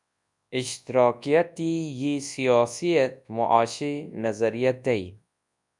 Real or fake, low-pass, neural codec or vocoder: fake; 10.8 kHz; codec, 24 kHz, 0.9 kbps, WavTokenizer, large speech release